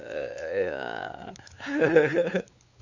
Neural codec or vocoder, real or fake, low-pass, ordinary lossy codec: codec, 16 kHz, 4 kbps, X-Codec, WavLM features, trained on Multilingual LibriSpeech; fake; 7.2 kHz; none